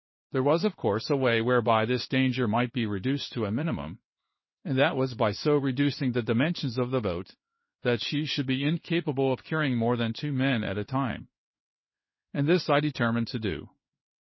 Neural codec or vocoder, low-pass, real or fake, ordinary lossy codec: codec, 16 kHz in and 24 kHz out, 1 kbps, XY-Tokenizer; 7.2 kHz; fake; MP3, 24 kbps